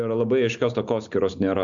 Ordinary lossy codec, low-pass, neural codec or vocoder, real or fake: MP3, 64 kbps; 7.2 kHz; none; real